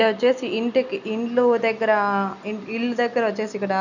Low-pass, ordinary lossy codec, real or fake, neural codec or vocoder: 7.2 kHz; none; real; none